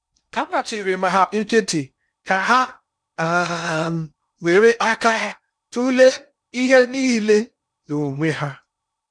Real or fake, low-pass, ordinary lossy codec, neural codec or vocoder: fake; 9.9 kHz; none; codec, 16 kHz in and 24 kHz out, 0.6 kbps, FocalCodec, streaming, 2048 codes